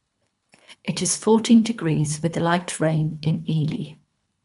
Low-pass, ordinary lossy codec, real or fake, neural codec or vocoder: 10.8 kHz; MP3, 96 kbps; fake; codec, 24 kHz, 3 kbps, HILCodec